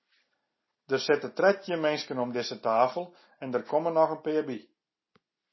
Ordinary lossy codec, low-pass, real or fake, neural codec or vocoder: MP3, 24 kbps; 7.2 kHz; real; none